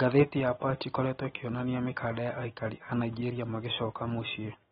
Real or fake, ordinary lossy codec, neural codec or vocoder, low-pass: real; AAC, 16 kbps; none; 19.8 kHz